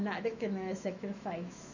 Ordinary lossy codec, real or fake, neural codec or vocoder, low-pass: none; fake; autoencoder, 48 kHz, 128 numbers a frame, DAC-VAE, trained on Japanese speech; 7.2 kHz